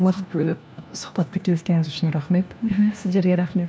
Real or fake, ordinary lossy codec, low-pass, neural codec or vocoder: fake; none; none; codec, 16 kHz, 1 kbps, FunCodec, trained on LibriTTS, 50 frames a second